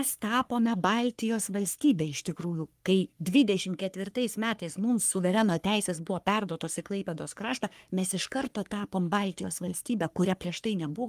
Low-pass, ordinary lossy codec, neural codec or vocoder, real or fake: 14.4 kHz; Opus, 32 kbps; codec, 44.1 kHz, 3.4 kbps, Pupu-Codec; fake